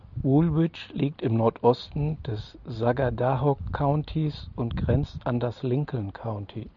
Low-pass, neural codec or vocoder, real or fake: 7.2 kHz; none; real